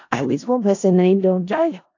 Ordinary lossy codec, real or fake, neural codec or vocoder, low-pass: AAC, 48 kbps; fake; codec, 16 kHz in and 24 kHz out, 0.4 kbps, LongCat-Audio-Codec, four codebook decoder; 7.2 kHz